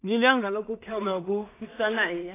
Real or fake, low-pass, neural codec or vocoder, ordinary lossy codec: fake; 3.6 kHz; codec, 16 kHz in and 24 kHz out, 0.4 kbps, LongCat-Audio-Codec, two codebook decoder; AAC, 16 kbps